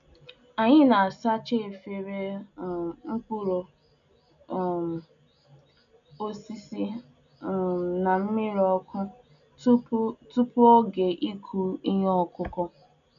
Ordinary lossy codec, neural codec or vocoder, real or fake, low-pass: AAC, 96 kbps; none; real; 7.2 kHz